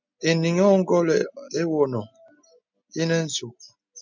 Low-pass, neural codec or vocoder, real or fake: 7.2 kHz; none; real